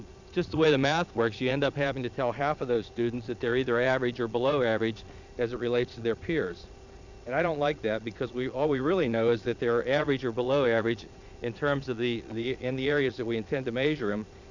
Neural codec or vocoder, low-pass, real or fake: vocoder, 22.05 kHz, 80 mel bands, Vocos; 7.2 kHz; fake